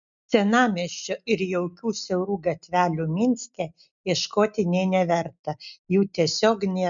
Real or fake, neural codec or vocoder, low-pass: real; none; 7.2 kHz